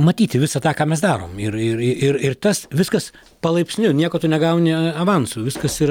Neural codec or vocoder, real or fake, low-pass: none; real; 19.8 kHz